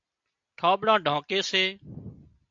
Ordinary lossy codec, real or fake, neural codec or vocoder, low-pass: AAC, 64 kbps; real; none; 7.2 kHz